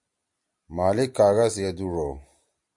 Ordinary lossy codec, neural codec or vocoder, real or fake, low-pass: MP3, 96 kbps; none; real; 10.8 kHz